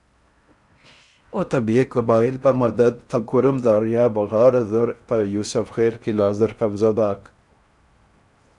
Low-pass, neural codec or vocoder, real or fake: 10.8 kHz; codec, 16 kHz in and 24 kHz out, 0.6 kbps, FocalCodec, streaming, 4096 codes; fake